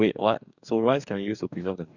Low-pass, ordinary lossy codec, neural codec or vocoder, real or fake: 7.2 kHz; none; codec, 44.1 kHz, 2.6 kbps, DAC; fake